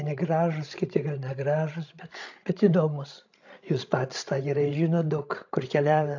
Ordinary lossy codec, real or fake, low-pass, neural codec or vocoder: AAC, 48 kbps; fake; 7.2 kHz; codec, 16 kHz, 16 kbps, FreqCodec, larger model